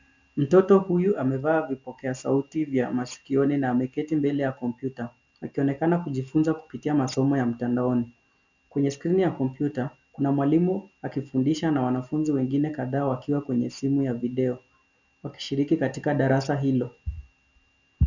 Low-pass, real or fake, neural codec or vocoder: 7.2 kHz; real; none